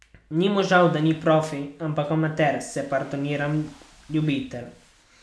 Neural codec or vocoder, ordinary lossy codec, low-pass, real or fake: none; none; none; real